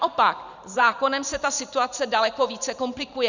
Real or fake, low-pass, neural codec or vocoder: fake; 7.2 kHz; vocoder, 44.1 kHz, 128 mel bands every 512 samples, BigVGAN v2